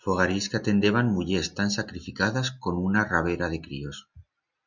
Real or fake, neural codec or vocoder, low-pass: real; none; 7.2 kHz